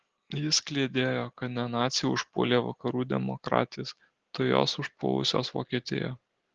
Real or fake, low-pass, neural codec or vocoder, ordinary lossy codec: real; 7.2 kHz; none; Opus, 16 kbps